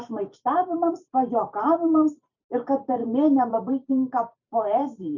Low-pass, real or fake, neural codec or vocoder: 7.2 kHz; fake; vocoder, 44.1 kHz, 128 mel bands every 512 samples, BigVGAN v2